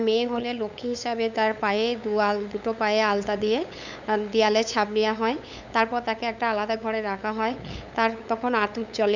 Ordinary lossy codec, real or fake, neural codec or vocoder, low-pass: none; fake; codec, 16 kHz, 8 kbps, FunCodec, trained on LibriTTS, 25 frames a second; 7.2 kHz